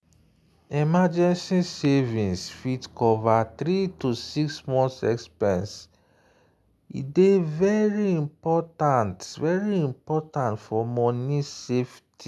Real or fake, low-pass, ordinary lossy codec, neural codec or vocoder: real; none; none; none